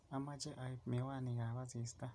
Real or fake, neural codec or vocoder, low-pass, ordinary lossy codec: real; none; none; none